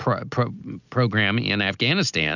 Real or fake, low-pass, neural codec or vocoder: real; 7.2 kHz; none